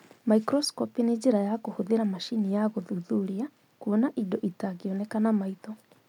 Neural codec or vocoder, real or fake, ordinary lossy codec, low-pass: none; real; none; 19.8 kHz